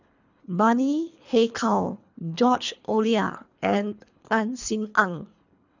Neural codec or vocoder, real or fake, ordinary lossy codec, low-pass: codec, 24 kHz, 3 kbps, HILCodec; fake; none; 7.2 kHz